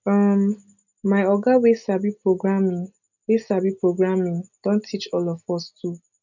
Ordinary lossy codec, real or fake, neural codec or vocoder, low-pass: none; real; none; 7.2 kHz